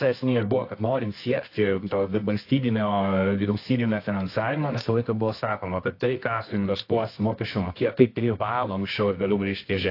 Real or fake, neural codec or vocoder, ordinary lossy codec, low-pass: fake; codec, 24 kHz, 0.9 kbps, WavTokenizer, medium music audio release; AAC, 32 kbps; 5.4 kHz